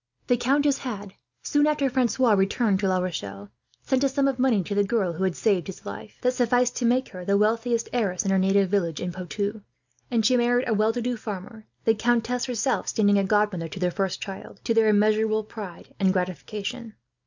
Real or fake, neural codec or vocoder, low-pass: real; none; 7.2 kHz